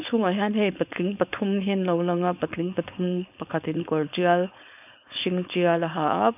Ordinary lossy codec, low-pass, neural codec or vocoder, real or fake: none; 3.6 kHz; codec, 16 kHz, 4.8 kbps, FACodec; fake